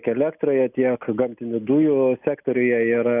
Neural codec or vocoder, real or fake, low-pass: none; real; 3.6 kHz